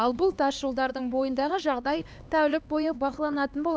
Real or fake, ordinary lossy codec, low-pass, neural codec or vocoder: fake; none; none; codec, 16 kHz, 2 kbps, X-Codec, HuBERT features, trained on LibriSpeech